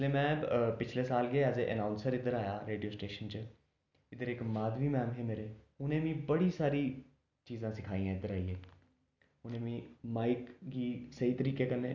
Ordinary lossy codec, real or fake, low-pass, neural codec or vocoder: none; real; 7.2 kHz; none